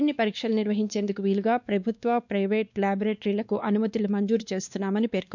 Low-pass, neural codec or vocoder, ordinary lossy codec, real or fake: 7.2 kHz; codec, 16 kHz, 2 kbps, X-Codec, WavLM features, trained on Multilingual LibriSpeech; none; fake